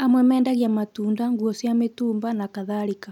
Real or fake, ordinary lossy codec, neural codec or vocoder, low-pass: real; none; none; 19.8 kHz